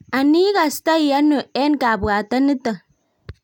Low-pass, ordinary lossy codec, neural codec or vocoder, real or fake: 19.8 kHz; none; none; real